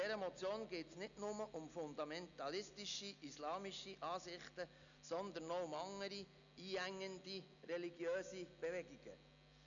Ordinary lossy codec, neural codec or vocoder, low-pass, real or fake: none; none; 7.2 kHz; real